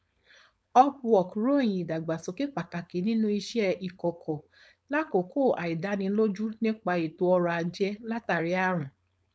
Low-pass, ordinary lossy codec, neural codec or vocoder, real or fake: none; none; codec, 16 kHz, 4.8 kbps, FACodec; fake